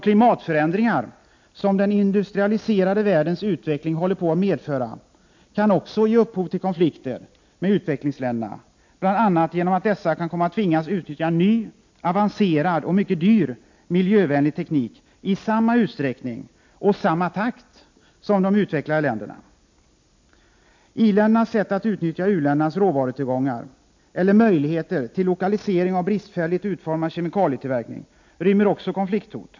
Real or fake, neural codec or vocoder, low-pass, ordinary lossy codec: real; none; 7.2 kHz; MP3, 48 kbps